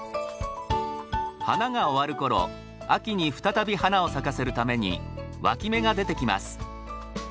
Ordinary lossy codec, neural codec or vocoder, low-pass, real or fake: none; none; none; real